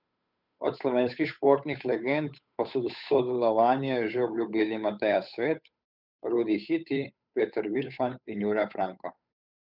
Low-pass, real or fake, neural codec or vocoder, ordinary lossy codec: 5.4 kHz; fake; codec, 16 kHz, 8 kbps, FunCodec, trained on Chinese and English, 25 frames a second; none